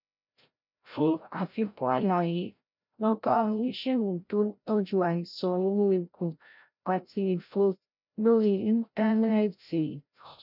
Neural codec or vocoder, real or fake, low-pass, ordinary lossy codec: codec, 16 kHz, 0.5 kbps, FreqCodec, larger model; fake; 5.4 kHz; none